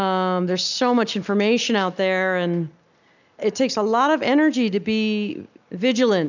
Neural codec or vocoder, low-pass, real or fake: none; 7.2 kHz; real